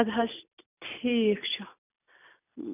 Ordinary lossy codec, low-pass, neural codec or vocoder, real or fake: none; 3.6 kHz; none; real